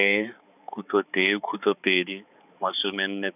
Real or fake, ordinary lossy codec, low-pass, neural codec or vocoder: fake; none; 3.6 kHz; codec, 16 kHz, 4 kbps, X-Codec, HuBERT features, trained on balanced general audio